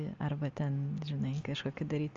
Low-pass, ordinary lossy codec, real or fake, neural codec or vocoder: 7.2 kHz; Opus, 32 kbps; real; none